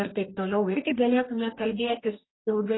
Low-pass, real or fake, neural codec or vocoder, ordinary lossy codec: 7.2 kHz; fake; codec, 44.1 kHz, 2.6 kbps, DAC; AAC, 16 kbps